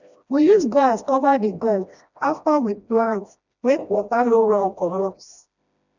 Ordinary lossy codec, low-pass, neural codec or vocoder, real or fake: none; 7.2 kHz; codec, 16 kHz, 1 kbps, FreqCodec, smaller model; fake